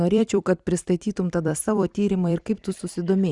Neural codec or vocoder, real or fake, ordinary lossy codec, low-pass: vocoder, 44.1 kHz, 128 mel bands every 256 samples, BigVGAN v2; fake; Opus, 64 kbps; 10.8 kHz